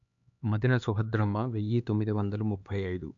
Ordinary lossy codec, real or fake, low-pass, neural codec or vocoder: AAC, 64 kbps; fake; 7.2 kHz; codec, 16 kHz, 2 kbps, X-Codec, HuBERT features, trained on LibriSpeech